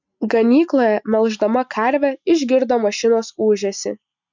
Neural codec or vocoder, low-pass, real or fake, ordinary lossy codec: none; 7.2 kHz; real; MP3, 64 kbps